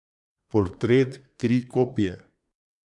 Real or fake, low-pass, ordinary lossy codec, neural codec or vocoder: fake; 10.8 kHz; none; codec, 24 kHz, 1 kbps, SNAC